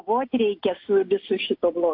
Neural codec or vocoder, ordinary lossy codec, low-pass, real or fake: none; AAC, 32 kbps; 5.4 kHz; real